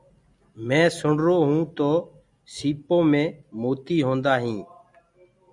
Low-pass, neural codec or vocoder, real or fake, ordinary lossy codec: 10.8 kHz; none; real; MP3, 96 kbps